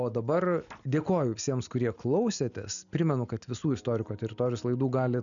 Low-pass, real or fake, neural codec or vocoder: 7.2 kHz; real; none